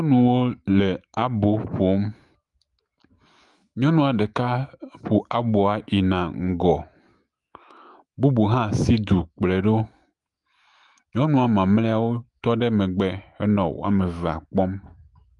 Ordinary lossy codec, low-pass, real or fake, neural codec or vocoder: Opus, 32 kbps; 10.8 kHz; fake; autoencoder, 48 kHz, 128 numbers a frame, DAC-VAE, trained on Japanese speech